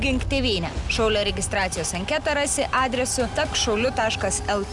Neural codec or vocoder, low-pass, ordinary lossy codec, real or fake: none; 10.8 kHz; Opus, 64 kbps; real